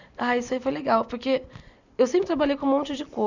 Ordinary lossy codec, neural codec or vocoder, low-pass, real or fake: none; none; 7.2 kHz; real